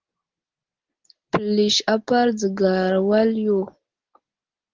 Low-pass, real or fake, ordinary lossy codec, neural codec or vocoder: 7.2 kHz; real; Opus, 16 kbps; none